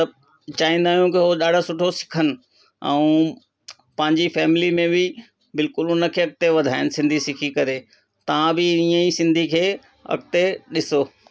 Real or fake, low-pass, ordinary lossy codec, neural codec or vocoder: real; none; none; none